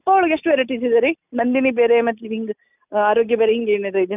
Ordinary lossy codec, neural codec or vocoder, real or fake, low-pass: none; none; real; 3.6 kHz